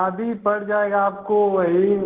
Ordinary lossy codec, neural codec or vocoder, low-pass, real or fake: Opus, 16 kbps; none; 3.6 kHz; real